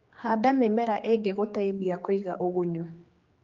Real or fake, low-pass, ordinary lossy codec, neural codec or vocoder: fake; 7.2 kHz; Opus, 32 kbps; codec, 16 kHz, 2 kbps, X-Codec, HuBERT features, trained on general audio